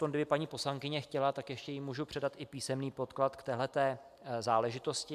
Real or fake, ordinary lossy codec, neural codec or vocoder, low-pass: real; AAC, 96 kbps; none; 14.4 kHz